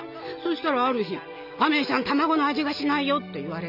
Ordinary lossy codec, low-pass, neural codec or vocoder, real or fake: none; 5.4 kHz; none; real